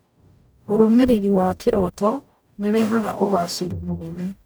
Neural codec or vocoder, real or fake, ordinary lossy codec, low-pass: codec, 44.1 kHz, 0.9 kbps, DAC; fake; none; none